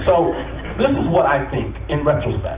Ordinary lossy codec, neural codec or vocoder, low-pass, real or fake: Opus, 64 kbps; none; 3.6 kHz; real